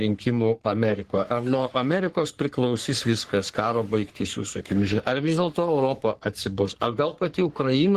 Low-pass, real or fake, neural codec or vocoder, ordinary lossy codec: 14.4 kHz; fake; codec, 44.1 kHz, 3.4 kbps, Pupu-Codec; Opus, 16 kbps